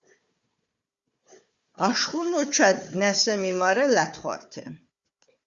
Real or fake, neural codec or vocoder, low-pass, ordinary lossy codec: fake; codec, 16 kHz, 4 kbps, FunCodec, trained on Chinese and English, 50 frames a second; 7.2 kHz; Opus, 64 kbps